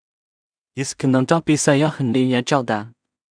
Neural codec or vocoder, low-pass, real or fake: codec, 16 kHz in and 24 kHz out, 0.4 kbps, LongCat-Audio-Codec, two codebook decoder; 9.9 kHz; fake